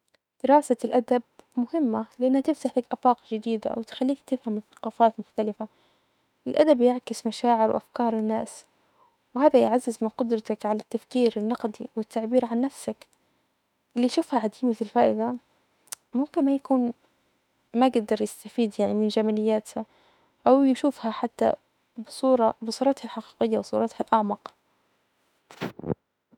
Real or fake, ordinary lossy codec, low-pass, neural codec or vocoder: fake; none; 19.8 kHz; autoencoder, 48 kHz, 32 numbers a frame, DAC-VAE, trained on Japanese speech